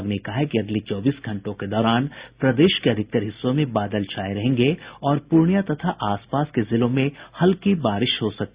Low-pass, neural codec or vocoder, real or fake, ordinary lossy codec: 3.6 kHz; none; real; Opus, 64 kbps